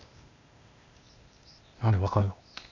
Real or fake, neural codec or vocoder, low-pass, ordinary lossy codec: fake; codec, 16 kHz, 0.8 kbps, ZipCodec; 7.2 kHz; none